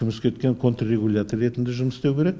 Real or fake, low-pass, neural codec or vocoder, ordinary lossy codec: real; none; none; none